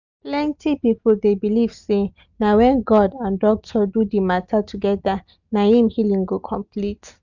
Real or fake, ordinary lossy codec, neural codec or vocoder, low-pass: real; none; none; 7.2 kHz